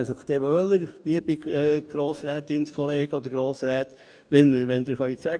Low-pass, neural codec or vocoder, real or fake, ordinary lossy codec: 9.9 kHz; codec, 44.1 kHz, 2.6 kbps, DAC; fake; none